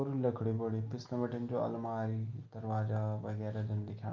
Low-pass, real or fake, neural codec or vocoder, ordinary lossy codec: 7.2 kHz; real; none; Opus, 24 kbps